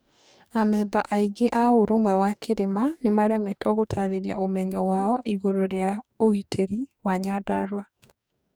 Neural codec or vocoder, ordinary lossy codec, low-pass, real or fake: codec, 44.1 kHz, 2.6 kbps, DAC; none; none; fake